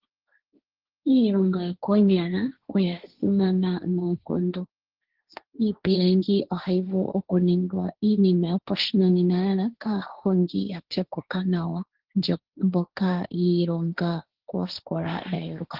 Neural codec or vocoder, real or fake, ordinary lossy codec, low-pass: codec, 16 kHz, 1.1 kbps, Voila-Tokenizer; fake; Opus, 16 kbps; 5.4 kHz